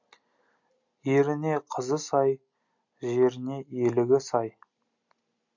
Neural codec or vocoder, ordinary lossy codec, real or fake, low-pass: none; MP3, 64 kbps; real; 7.2 kHz